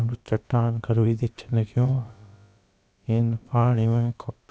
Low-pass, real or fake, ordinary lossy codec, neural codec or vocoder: none; fake; none; codec, 16 kHz, about 1 kbps, DyCAST, with the encoder's durations